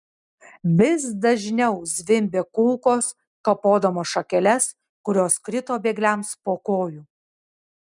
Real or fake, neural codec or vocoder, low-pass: real; none; 10.8 kHz